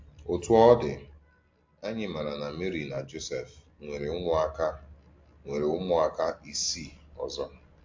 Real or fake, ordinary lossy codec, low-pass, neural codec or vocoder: real; MP3, 48 kbps; 7.2 kHz; none